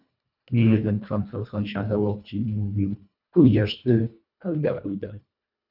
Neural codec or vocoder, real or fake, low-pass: codec, 24 kHz, 1.5 kbps, HILCodec; fake; 5.4 kHz